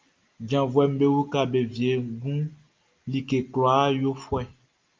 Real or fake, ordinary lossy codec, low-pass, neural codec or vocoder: real; Opus, 24 kbps; 7.2 kHz; none